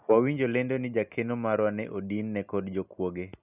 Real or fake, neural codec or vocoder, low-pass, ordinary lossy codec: real; none; 3.6 kHz; none